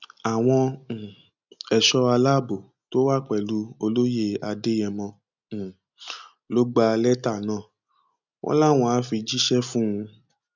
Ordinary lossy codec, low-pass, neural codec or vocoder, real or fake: none; 7.2 kHz; none; real